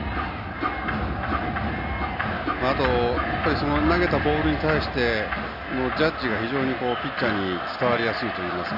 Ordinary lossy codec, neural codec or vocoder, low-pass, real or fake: none; none; 5.4 kHz; real